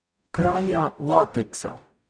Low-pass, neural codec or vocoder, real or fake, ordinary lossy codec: 9.9 kHz; codec, 44.1 kHz, 0.9 kbps, DAC; fake; none